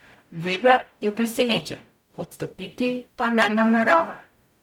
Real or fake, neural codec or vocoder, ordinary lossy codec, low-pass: fake; codec, 44.1 kHz, 0.9 kbps, DAC; none; 19.8 kHz